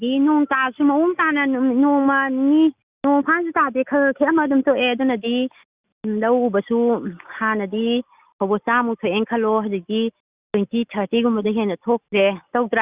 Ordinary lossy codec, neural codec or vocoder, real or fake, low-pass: Opus, 64 kbps; none; real; 3.6 kHz